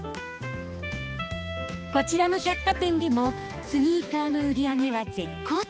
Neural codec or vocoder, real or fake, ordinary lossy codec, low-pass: codec, 16 kHz, 2 kbps, X-Codec, HuBERT features, trained on balanced general audio; fake; none; none